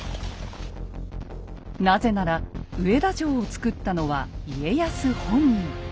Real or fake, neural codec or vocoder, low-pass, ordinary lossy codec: real; none; none; none